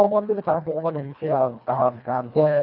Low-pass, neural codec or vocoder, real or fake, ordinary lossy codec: 5.4 kHz; codec, 24 kHz, 1.5 kbps, HILCodec; fake; none